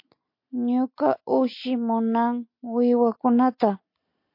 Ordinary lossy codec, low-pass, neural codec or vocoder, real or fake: MP3, 32 kbps; 5.4 kHz; none; real